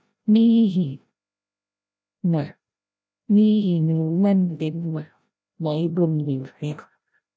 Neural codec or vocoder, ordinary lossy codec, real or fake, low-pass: codec, 16 kHz, 0.5 kbps, FreqCodec, larger model; none; fake; none